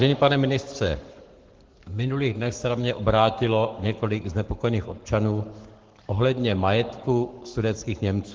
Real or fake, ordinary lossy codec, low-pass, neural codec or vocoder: fake; Opus, 16 kbps; 7.2 kHz; codec, 44.1 kHz, 7.8 kbps, Pupu-Codec